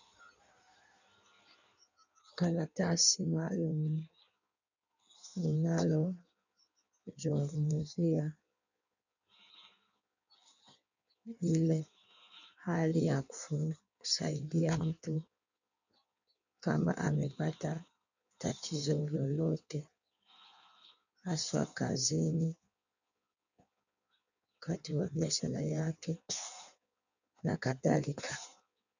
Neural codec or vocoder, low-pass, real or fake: codec, 16 kHz in and 24 kHz out, 1.1 kbps, FireRedTTS-2 codec; 7.2 kHz; fake